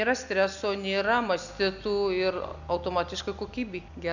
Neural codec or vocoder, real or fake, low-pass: none; real; 7.2 kHz